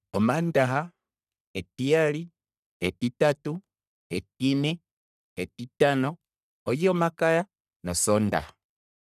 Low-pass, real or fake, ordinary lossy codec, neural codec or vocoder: 14.4 kHz; fake; none; codec, 44.1 kHz, 3.4 kbps, Pupu-Codec